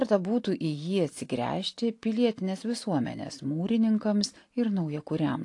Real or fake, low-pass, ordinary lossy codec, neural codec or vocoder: real; 10.8 kHz; AAC, 48 kbps; none